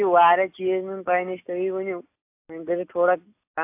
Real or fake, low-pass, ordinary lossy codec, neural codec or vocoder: real; 3.6 kHz; AAC, 24 kbps; none